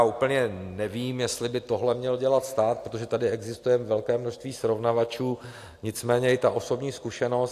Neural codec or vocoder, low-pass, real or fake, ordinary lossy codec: none; 14.4 kHz; real; AAC, 64 kbps